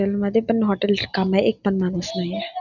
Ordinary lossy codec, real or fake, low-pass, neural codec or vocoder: none; real; 7.2 kHz; none